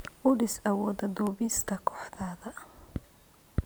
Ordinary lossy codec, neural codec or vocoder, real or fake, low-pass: none; vocoder, 44.1 kHz, 128 mel bands every 512 samples, BigVGAN v2; fake; none